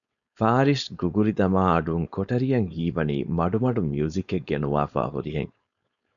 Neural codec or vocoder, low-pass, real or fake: codec, 16 kHz, 4.8 kbps, FACodec; 7.2 kHz; fake